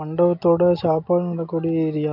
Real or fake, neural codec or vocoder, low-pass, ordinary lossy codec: real; none; 5.4 kHz; none